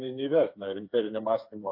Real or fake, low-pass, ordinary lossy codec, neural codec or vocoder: fake; 5.4 kHz; AAC, 32 kbps; codec, 16 kHz, 4 kbps, FreqCodec, smaller model